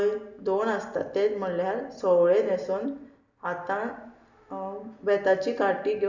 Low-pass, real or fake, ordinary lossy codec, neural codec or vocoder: 7.2 kHz; real; none; none